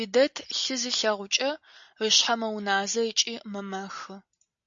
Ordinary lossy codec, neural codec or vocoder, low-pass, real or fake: AAC, 64 kbps; none; 7.2 kHz; real